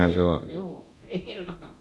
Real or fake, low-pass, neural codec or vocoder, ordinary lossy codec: fake; none; codec, 24 kHz, 0.9 kbps, DualCodec; none